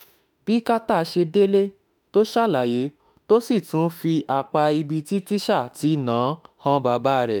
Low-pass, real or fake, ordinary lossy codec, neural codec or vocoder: none; fake; none; autoencoder, 48 kHz, 32 numbers a frame, DAC-VAE, trained on Japanese speech